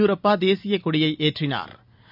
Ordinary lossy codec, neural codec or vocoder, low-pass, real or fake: none; none; 5.4 kHz; real